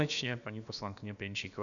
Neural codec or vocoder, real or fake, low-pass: codec, 16 kHz, about 1 kbps, DyCAST, with the encoder's durations; fake; 7.2 kHz